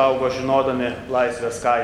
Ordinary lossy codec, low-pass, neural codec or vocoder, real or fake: MP3, 96 kbps; 19.8 kHz; none; real